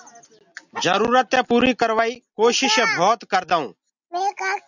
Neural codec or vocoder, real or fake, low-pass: none; real; 7.2 kHz